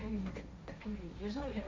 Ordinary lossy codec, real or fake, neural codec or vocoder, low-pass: none; fake; codec, 16 kHz, 1.1 kbps, Voila-Tokenizer; none